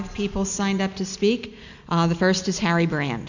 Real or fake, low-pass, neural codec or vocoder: real; 7.2 kHz; none